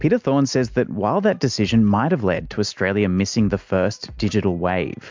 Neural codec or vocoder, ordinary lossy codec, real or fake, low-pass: none; MP3, 64 kbps; real; 7.2 kHz